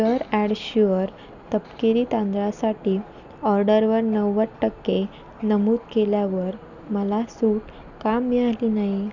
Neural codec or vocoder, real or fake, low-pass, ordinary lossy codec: none; real; 7.2 kHz; none